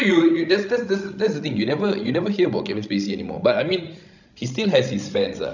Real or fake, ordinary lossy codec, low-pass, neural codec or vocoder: fake; none; 7.2 kHz; codec, 16 kHz, 16 kbps, FreqCodec, larger model